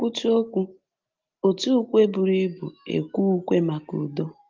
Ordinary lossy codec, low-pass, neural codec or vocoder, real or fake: Opus, 32 kbps; 7.2 kHz; none; real